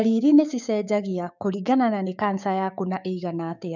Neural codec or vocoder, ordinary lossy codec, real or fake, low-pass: codec, 16 kHz, 6 kbps, DAC; none; fake; 7.2 kHz